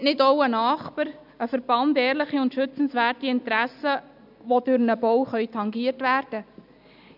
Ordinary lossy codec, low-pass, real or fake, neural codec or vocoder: none; 5.4 kHz; real; none